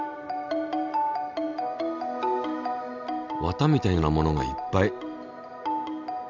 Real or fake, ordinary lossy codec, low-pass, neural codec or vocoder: real; none; 7.2 kHz; none